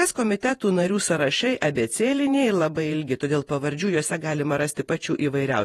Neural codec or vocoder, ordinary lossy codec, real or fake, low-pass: vocoder, 48 kHz, 128 mel bands, Vocos; AAC, 32 kbps; fake; 19.8 kHz